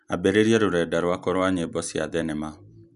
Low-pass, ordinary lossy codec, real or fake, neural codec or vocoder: 10.8 kHz; none; real; none